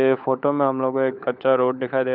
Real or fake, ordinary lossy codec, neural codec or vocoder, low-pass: fake; none; codec, 16 kHz, 16 kbps, FunCodec, trained on LibriTTS, 50 frames a second; 5.4 kHz